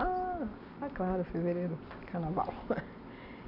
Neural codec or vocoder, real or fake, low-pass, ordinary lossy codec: none; real; 5.4 kHz; none